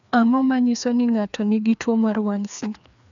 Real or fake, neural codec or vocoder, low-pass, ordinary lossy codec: fake; codec, 16 kHz, 2 kbps, FreqCodec, larger model; 7.2 kHz; none